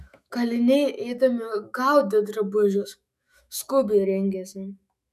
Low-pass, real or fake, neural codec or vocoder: 14.4 kHz; fake; autoencoder, 48 kHz, 128 numbers a frame, DAC-VAE, trained on Japanese speech